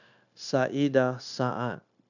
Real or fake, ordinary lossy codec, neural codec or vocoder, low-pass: fake; none; codec, 16 kHz, 0.9 kbps, LongCat-Audio-Codec; 7.2 kHz